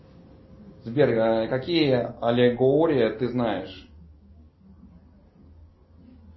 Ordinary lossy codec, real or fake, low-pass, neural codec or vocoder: MP3, 24 kbps; real; 7.2 kHz; none